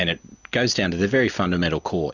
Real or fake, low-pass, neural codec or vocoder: real; 7.2 kHz; none